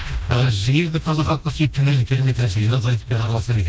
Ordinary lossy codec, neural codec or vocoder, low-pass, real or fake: none; codec, 16 kHz, 1 kbps, FreqCodec, smaller model; none; fake